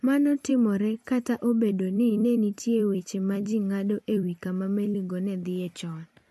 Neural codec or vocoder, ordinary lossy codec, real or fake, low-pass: vocoder, 44.1 kHz, 128 mel bands every 256 samples, BigVGAN v2; MP3, 64 kbps; fake; 14.4 kHz